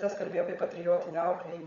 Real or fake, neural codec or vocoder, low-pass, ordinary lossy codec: fake; codec, 16 kHz, 16 kbps, FunCodec, trained on LibriTTS, 50 frames a second; 7.2 kHz; MP3, 48 kbps